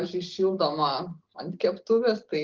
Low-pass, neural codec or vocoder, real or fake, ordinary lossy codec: 7.2 kHz; none; real; Opus, 16 kbps